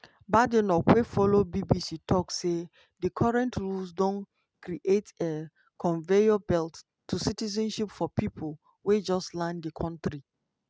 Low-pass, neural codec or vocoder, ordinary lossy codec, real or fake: none; none; none; real